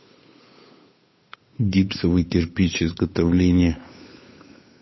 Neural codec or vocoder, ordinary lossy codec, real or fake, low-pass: codec, 16 kHz, 8 kbps, FunCodec, trained on Chinese and English, 25 frames a second; MP3, 24 kbps; fake; 7.2 kHz